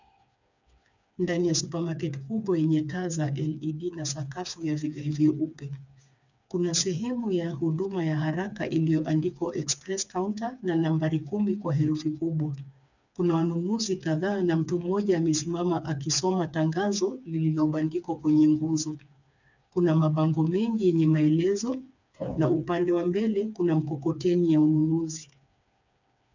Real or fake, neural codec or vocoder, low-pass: fake; codec, 16 kHz, 4 kbps, FreqCodec, smaller model; 7.2 kHz